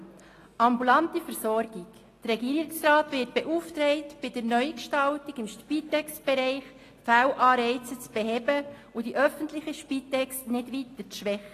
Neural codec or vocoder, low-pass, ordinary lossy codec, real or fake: none; 14.4 kHz; AAC, 48 kbps; real